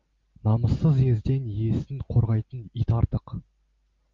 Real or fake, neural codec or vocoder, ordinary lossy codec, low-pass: real; none; Opus, 32 kbps; 7.2 kHz